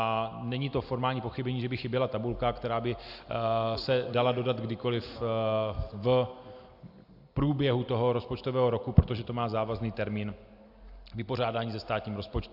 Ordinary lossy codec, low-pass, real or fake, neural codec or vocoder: AAC, 48 kbps; 5.4 kHz; real; none